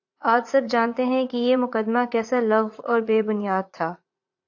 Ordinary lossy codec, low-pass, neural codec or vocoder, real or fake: AAC, 48 kbps; 7.2 kHz; vocoder, 44.1 kHz, 80 mel bands, Vocos; fake